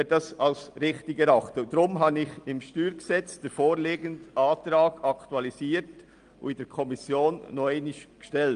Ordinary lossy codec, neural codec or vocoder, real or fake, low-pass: Opus, 32 kbps; none; real; 9.9 kHz